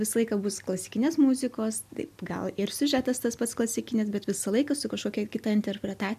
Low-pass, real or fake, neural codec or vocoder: 14.4 kHz; real; none